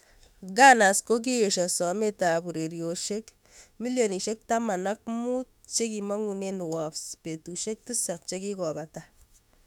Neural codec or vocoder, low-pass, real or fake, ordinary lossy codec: autoencoder, 48 kHz, 32 numbers a frame, DAC-VAE, trained on Japanese speech; 19.8 kHz; fake; none